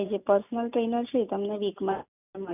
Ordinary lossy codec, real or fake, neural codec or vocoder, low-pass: none; real; none; 3.6 kHz